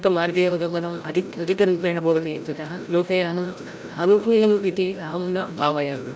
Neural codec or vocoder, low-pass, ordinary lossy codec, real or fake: codec, 16 kHz, 0.5 kbps, FreqCodec, larger model; none; none; fake